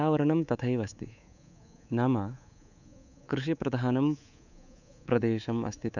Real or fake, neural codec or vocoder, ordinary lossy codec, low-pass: fake; codec, 24 kHz, 3.1 kbps, DualCodec; none; 7.2 kHz